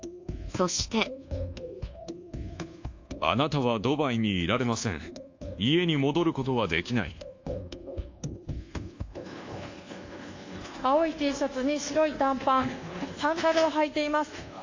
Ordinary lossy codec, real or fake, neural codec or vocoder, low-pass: AAC, 48 kbps; fake; codec, 24 kHz, 1.2 kbps, DualCodec; 7.2 kHz